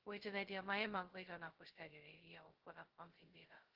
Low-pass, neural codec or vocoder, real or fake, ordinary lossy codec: 5.4 kHz; codec, 16 kHz, 0.2 kbps, FocalCodec; fake; Opus, 16 kbps